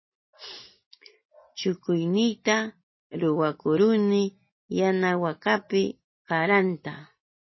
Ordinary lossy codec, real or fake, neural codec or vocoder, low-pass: MP3, 24 kbps; real; none; 7.2 kHz